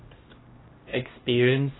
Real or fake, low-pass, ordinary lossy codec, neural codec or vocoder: fake; 7.2 kHz; AAC, 16 kbps; codec, 16 kHz, 1 kbps, X-Codec, HuBERT features, trained on LibriSpeech